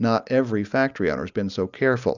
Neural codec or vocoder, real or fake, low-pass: none; real; 7.2 kHz